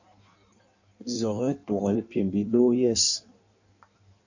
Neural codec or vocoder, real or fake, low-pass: codec, 16 kHz in and 24 kHz out, 1.1 kbps, FireRedTTS-2 codec; fake; 7.2 kHz